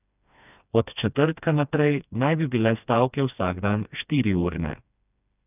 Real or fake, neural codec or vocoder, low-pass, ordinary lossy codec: fake; codec, 16 kHz, 2 kbps, FreqCodec, smaller model; 3.6 kHz; none